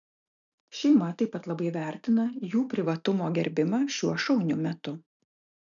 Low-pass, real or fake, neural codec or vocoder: 7.2 kHz; real; none